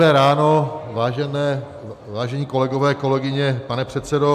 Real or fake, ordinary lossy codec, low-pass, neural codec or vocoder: real; MP3, 96 kbps; 14.4 kHz; none